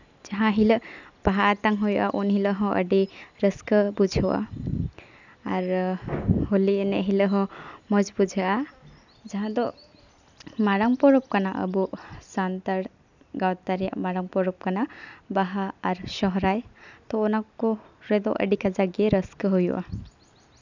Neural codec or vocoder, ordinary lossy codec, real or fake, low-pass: none; none; real; 7.2 kHz